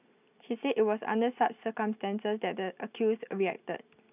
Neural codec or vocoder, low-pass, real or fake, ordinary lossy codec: none; 3.6 kHz; real; none